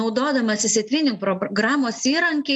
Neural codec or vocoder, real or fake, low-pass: none; real; 10.8 kHz